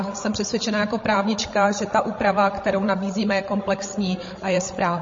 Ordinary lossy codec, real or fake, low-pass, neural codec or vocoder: MP3, 32 kbps; fake; 7.2 kHz; codec, 16 kHz, 16 kbps, FreqCodec, larger model